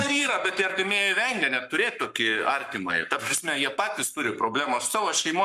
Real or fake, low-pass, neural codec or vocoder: fake; 14.4 kHz; codec, 44.1 kHz, 7.8 kbps, Pupu-Codec